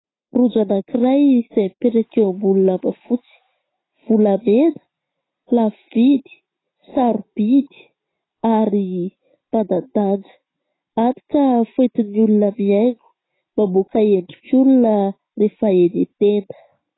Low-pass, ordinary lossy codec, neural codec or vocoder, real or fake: 7.2 kHz; AAC, 16 kbps; none; real